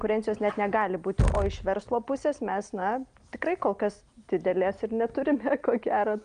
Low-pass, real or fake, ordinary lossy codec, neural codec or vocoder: 9.9 kHz; real; Opus, 32 kbps; none